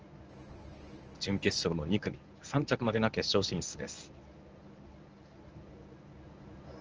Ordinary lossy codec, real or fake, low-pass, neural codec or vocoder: Opus, 24 kbps; fake; 7.2 kHz; codec, 24 kHz, 0.9 kbps, WavTokenizer, medium speech release version 1